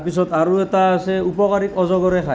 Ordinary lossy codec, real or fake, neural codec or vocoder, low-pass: none; real; none; none